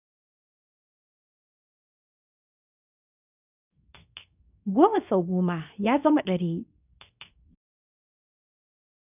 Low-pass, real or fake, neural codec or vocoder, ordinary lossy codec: 3.6 kHz; fake; codec, 24 kHz, 0.9 kbps, WavTokenizer, medium speech release version 2; none